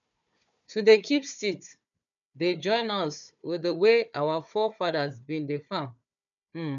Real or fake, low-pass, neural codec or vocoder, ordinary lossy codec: fake; 7.2 kHz; codec, 16 kHz, 4 kbps, FunCodec, trained on Chinese and English, 50 frames a second; none